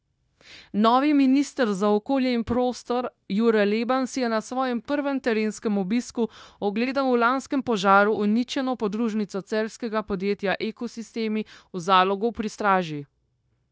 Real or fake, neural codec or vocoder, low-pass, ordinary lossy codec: fake; codec, 16 kHz, 0.9 kbps, LongCat-Audio-Codec; none; none